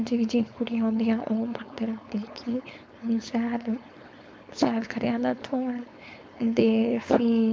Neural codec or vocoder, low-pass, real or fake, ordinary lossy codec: codec, 16 kHz, 4.8 kbps, FACodec; none; fake; none